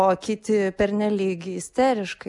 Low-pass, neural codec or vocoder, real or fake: 10.8 kHz; none; real